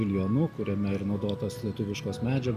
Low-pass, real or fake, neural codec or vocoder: 14.4 kHz; real; none